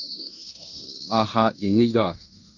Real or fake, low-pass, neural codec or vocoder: fake; 7.2 kHz; codec, 16 kHz in and 24 kHz out, 0.9 kbps, LongCat-Audio-Codec, fine tuned four codebook decoder